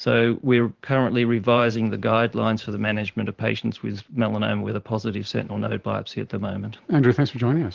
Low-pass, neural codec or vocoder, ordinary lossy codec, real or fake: 7.2 kHz; vocoder, 44.1 kHz, 128 mel bands every 512 samples, BigVGAN v2; Opus, 16 kbps; fake